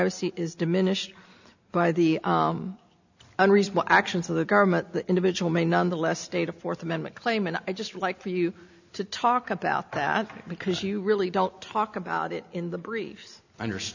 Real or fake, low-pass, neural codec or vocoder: real; 7.2 kHz; none